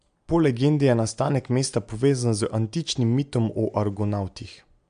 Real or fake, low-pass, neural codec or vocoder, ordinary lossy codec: real; 9.9 kHz; none; MP3, 64 kbps